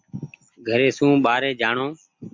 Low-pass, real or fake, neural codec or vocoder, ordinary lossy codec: 7.2 kHz; real; none; MP3, 48 kbps